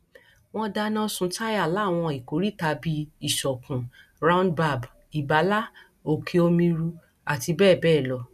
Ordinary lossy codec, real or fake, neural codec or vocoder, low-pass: none; real; none; 14.4 kHz